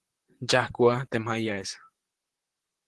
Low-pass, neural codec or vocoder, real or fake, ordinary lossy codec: 10.8 kHz; vocoder, 44.1 kHz, 128 mel bands, Pupu-Vocoder; fake; Opus, 16 kbps